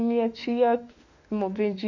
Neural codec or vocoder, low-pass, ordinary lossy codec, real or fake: autoencoder, 48 kHz, 32 numbers a frame, DAC-VAE, trained on Japanese speech; 7.2 kHz; none; fake